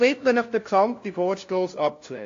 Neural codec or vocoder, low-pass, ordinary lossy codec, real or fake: codec, 16 kHz, 0.5 kbps, FunCodec, trained on LibriTTS, 25 frames a second; 7.2 kHz; AAC, 48 kbps; fake